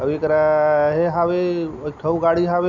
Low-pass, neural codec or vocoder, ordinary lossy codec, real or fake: 7.2 kHz; none; none; real